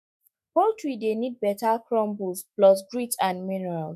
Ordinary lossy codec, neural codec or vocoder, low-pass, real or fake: MP3, 96 kbps; autoencoder, 48 kHz, 128 numbers a frame, DAC-VAE, trained on Japanese speech; 14.4 kHz; fake